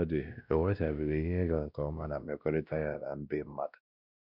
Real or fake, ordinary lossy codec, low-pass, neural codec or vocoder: fake; none; 5.4 kHz; codec, 16 kHz, 1 kbps, X-Codec, WavLM features, trained on Multilingual LibriSpeech